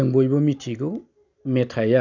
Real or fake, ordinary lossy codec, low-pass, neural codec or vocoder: real; none; 7.2 kHz; none